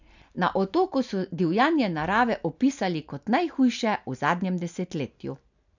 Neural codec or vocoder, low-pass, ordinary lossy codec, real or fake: none; 7.2 kHz; none; real